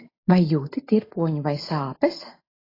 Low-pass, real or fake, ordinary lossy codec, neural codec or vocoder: 5.4 kHz; real; AAC, 24 kbps; none